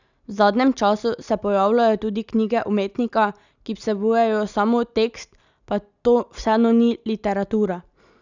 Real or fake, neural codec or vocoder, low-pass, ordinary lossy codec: real; none; 7.2 kHz; none